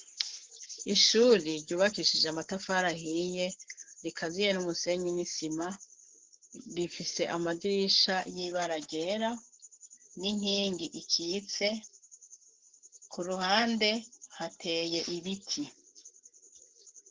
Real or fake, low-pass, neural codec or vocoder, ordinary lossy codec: fake; 7.2 kHz; codec, 44.1 kHz, 7.8 kbps, Pupu-Codec; Opus, 16 kbps